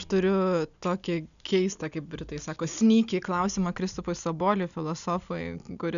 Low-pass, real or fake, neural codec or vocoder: 7.2 kHz; real; none